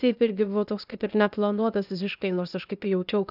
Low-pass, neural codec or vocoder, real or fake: 5.4 kHz; codec, 16 kHz, 0.8 kbps, ZipCodec; fake